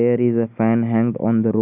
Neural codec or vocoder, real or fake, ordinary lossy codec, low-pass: none; real; none; 3.6 kHz